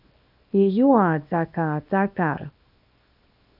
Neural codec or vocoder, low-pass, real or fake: codec, 24 kHz, 0.9 kbps, WavTokenizer, small release; 5.4 kHz; fake